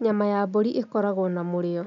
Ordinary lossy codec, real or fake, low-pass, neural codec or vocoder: none; real; 7.2 kHz; none